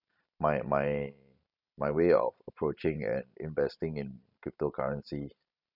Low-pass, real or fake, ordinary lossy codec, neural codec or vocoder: 5.4 kHz; fake; none; vocoder, 44.1 kHz, 128 mel bands every 512 samples, BigVGAN v2